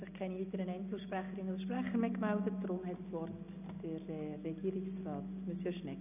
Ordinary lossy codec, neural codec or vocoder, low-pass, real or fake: none; none; 3.6 kHz; real